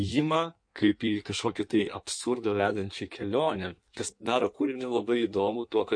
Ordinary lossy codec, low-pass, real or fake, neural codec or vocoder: MP3, 48 kbps; 9.9 kHz; fake; codec, 16 kHz in and 24 kHz out, 1.1 kbps, FireRedTTS-2 codec